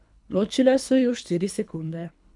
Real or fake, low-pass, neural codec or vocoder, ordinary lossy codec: fake; 10.8 kHz; codec, 24 kHz, 3 kbps, HILCodec; none